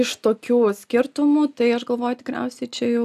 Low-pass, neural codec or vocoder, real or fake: 14.4 kHz; none; real